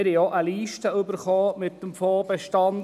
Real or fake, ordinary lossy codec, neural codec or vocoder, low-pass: real; none; none; 14.4 kHz